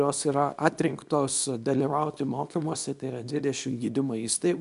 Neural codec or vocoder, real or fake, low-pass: codec, 24 kHz, 0.9 kbps, WavTokenizer, small release; fake; 10.8 kHz